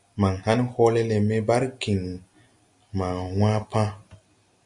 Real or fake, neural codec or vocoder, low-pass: real; none; 10.8 kHz